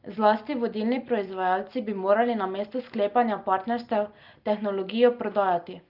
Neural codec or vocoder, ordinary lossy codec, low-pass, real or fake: none; Opus, 32 kbps; 5.4 kHz; real